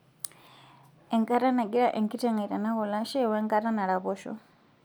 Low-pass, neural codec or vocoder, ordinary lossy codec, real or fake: none; none; none; real